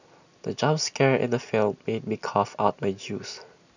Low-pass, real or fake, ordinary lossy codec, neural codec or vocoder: 7.2 kHz; real; none; none